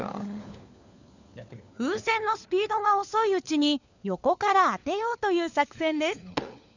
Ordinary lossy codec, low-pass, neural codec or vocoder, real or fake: none; 7.2 kHz; codec, 16 kHz, 4 kbps, FunCodec, trained on LibriTTS, 50 frames a second; fake